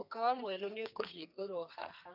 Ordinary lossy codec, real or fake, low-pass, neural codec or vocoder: none; fake; 5.4 kHz; codec, 32 kHz, 1.9 kbps, SNAC